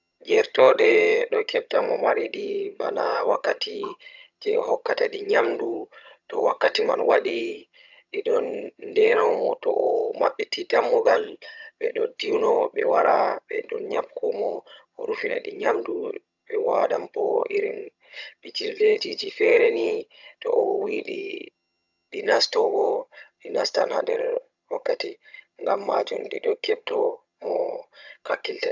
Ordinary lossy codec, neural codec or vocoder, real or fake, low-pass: none; vocoder, 22.05 kHz, 80 mel bands, HiFi-GAN; fake; 7.2 kHz